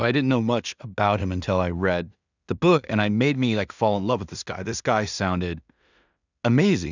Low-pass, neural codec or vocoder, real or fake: 7.2 kHz; codec, 16 kHz in and 24 kHz out, 0.4 kbps, LongCat-Audio-Codec, two codebook decoder; fake